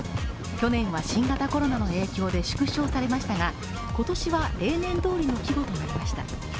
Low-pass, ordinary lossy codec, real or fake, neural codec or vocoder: none; none; real; none